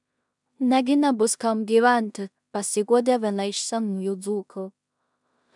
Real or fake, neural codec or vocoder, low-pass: fake; codec, 16 kHz in and 24 kHz out, 0.4 kbps, LongCat-Audio-Codec, two codebook decoder; 10.8 kHz